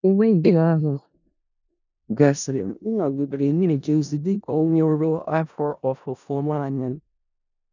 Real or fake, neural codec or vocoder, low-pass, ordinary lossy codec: fake; codec, 16 kHz in and 24 kHz out, 0.4 kbps, LongCat-Audio-Codec, four codebook decoder; 7.2 kHz; none